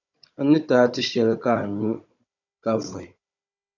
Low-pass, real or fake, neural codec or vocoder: 7.2 kHz; fake; codec, 16 kHz, 16 kbps, FunCodec, trained on Chinese and English, 50 frames a second